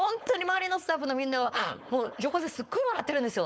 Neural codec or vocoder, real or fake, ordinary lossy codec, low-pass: codec, 16 kHz, 4.8 kbps, FACodec; fake; none; none